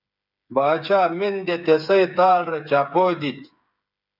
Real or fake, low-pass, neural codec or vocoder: fake; 5.4 kHz; codec, 16 kHz, 8 kbps, FreqCodec, smaller model